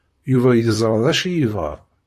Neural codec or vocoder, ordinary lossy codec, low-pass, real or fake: vocoder, 44.1 kHz, 128 mel bands, Pupu-Vocoder; AAC, 64 kbps; 14.4 kHz; fake